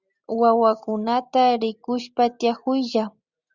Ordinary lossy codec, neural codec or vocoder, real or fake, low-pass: Opus, 64 kbps; none; real; 7.2 kHz